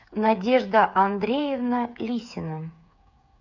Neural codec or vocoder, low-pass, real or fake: codec, 16 kHz, 8 kbps, FreqCodec, smaller model; 7.2 kHz; fake